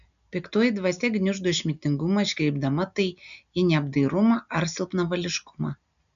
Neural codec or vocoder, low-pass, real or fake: none; 7.2 kHz; real